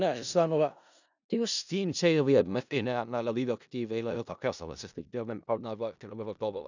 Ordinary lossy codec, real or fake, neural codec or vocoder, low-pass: none; fake; codec, 16 kHz in and 24 kHz out, 0.4 kbps, LongCat-Audio-Codec, four codebook decoder; 7.2 kHz